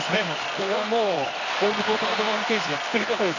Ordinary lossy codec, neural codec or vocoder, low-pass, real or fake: none; codec, 24 kHz, 0.9 kbps, WavTokenizer, medium music audio release; 7.2 kHz; fake